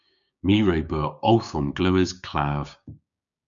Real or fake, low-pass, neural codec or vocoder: fake; 7.2 kHz; codec, 16 kHz, 6 kbps, DAC